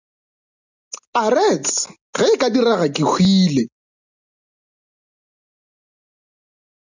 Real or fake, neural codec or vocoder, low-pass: real; none; 7.2 kHz